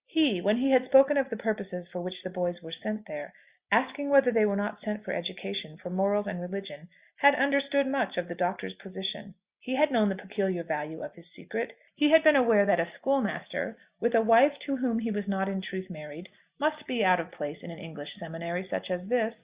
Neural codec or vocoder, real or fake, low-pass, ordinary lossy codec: none; real; 3.6 kHz; Opus, 64 kbps